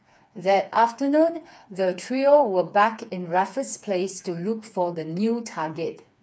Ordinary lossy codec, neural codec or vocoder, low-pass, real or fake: none; codec, 16 kHz, 4 kbps, FreqCodec, smaller model; none; fake